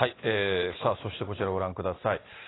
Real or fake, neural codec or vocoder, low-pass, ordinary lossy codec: real; none; 7.2 kHz; AAC, 16 kbps